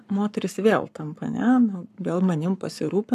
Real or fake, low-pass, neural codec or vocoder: fake; 14.4 kHz; codec, 44.1 kHz, 7.8 kbps, Pupu-Codec